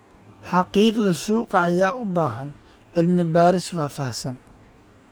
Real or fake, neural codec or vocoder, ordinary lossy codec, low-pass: fake; codec, 44.1 kHz, 2.6 kbps, DAC; none; none